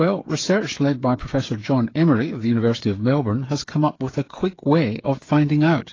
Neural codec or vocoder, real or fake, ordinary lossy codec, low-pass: codec, 16 kHz, 8 kbps, FreqCodec, smaller model; fake; AAC, 32 kbps; 7.2 kHz